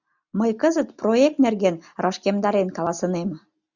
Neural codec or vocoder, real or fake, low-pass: none; real; 7.2 kHz